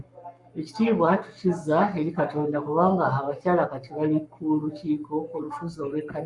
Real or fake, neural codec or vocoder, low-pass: fake; codec, 44.1 kHz, 7.8 kbps, Pupu-Codec; 10.8 kHz